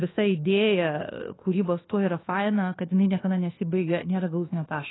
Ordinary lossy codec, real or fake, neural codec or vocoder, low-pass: AAC, 16 kbps; fake; codec, 24 kHz, 1.2 kbps, DualCodec; 7.2 kHz